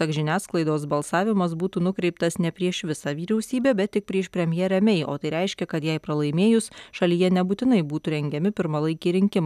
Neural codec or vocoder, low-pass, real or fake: none; 14.4 kHz; real